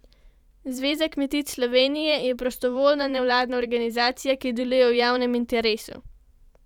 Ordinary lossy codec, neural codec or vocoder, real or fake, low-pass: none; vocoder, 44.1 kHz, 128 mel bands every 512 samples, BigVGAN v2; fake; 19.8 kHz